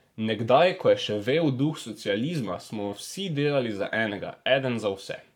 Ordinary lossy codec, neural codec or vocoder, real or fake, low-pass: none; codec, 44.1 kHz, 7.8 kbps, Pupu-Codec; fake; 19.8 kHz